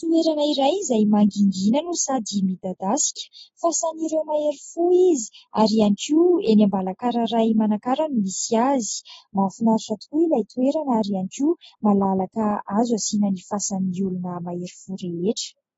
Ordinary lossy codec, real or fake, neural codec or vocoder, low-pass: AAC, 24 kbps; real; none; 19.8 kHz